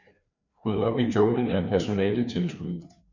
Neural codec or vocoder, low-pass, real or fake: codec, 16 kHz, 2 kbps, FreqCodec, larger model; 7.2 kHz; fake